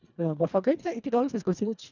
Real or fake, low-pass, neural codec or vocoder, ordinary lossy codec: fake; 7.2 kHz; codec, 24 kHz, 1.5 kbps, HILCodec; none